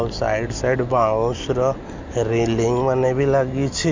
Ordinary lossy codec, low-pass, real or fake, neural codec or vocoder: MP3, 64 kbps; 7.2 kHz; real; none